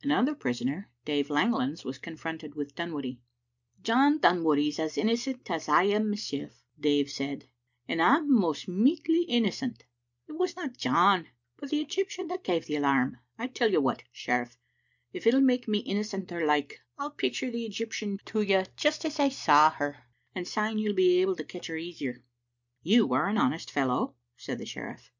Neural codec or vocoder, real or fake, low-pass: none; real; 7.2 kHz